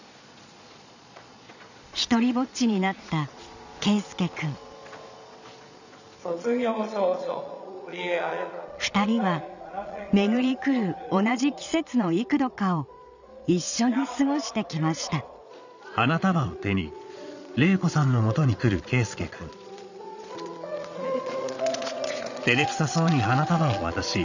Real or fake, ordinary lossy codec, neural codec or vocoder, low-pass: fake; none; vocoder, 22.05 kHz, 80 mel bands, Vocos; 7.2 kHz